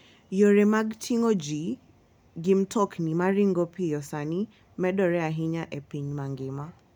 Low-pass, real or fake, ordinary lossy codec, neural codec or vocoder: 19.8 kHz; real; none; none